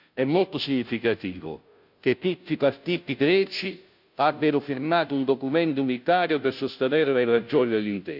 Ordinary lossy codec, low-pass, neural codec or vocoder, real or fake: none; 5.4 kHz; codec, 16 kHz, 0.5 kbps, FunCodec, trained on Chinese and English, 25 frames a second; fake